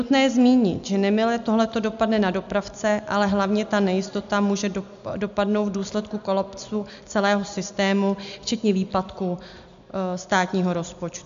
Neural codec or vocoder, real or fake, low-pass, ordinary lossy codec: none; real; 7.2 kHz; MP3, 64 kbps